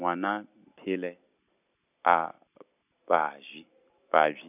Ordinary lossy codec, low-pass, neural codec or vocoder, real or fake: none; 3.6 kHz; none; real